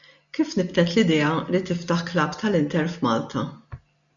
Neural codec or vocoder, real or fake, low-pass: none; real; 7.2 kHz